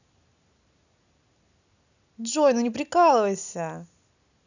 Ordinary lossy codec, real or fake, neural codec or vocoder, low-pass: none; real; none; 7.2 kHz